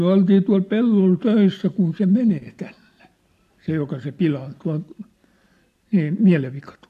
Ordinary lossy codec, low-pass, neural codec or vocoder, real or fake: AAC, 64 kbps; 14.4 kHz; none; real